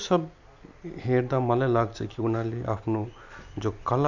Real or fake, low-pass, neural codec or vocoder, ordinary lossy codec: real; 7.2 kHz; none; none